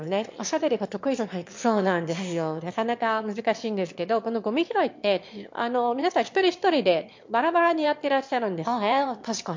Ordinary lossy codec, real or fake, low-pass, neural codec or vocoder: MP3, 48 kbps; fake; 7.2 kHz; autoencoder, 22.05 kHz, a latent of 192 numbers a frame, VITS, trained on one speaker